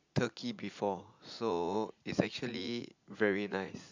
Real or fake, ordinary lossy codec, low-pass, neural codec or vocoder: fake; MP3, 64 kbps; 7.2 kHz; vocoder, 44.1 kHz, 80 mel bands, Vocos